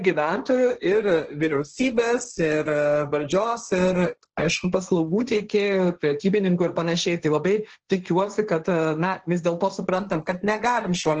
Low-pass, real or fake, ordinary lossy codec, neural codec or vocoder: 7.2 kHz; fake; Opus, 16 kbps; codec, 16 kHz, 1.1 kbps, Voila-Tokenizer